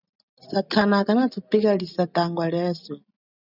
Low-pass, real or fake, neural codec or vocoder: 5.4 kHz; real; none